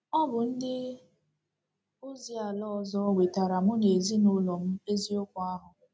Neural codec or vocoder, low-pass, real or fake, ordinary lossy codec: none; none; real; none